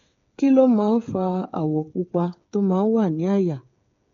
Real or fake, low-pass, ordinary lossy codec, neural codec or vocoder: fake; 7.2 kHz; MP3, 48 kbps; codec, 16 kHz, 8 kbps, FreqCodec, smaller model